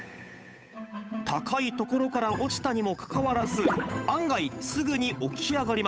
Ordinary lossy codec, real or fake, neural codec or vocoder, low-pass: none; fake; codec, 16 kHz, 8 kbps, FunCodec, trained on Chinese and English, 25 frames a second; none